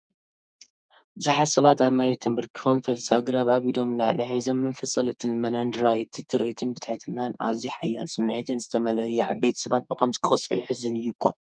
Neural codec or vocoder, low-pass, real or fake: codec, 32 kHz, 1.9 kbps, SNAC; 9.9 kHz; fake